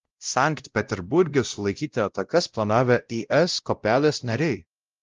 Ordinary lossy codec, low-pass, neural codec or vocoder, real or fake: Opus, 24 kbps; 7.2 kHz; codec, 16 kHz, 0.5 kbps, X-Codec, WavLM features, trained on Multilingual LibriSpeech; fake